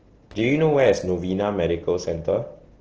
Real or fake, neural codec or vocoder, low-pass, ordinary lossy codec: real; none; 7.2 kHz; Opus, 16 kbps